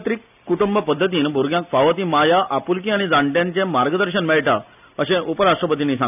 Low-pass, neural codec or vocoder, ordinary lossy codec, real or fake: 3.6 kHz; none; none; real